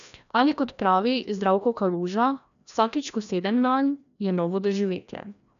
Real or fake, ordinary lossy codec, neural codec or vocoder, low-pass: fake; none; codec, 16 kHz, 1 kbps, FreqCodec, larger model; 7.2 kHz